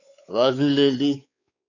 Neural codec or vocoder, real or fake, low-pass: codec, 16 kHz, 4 kbps, X-Codec, WavLM features, trained on Multilingual LibriSpeech; fake; 7.2 kHz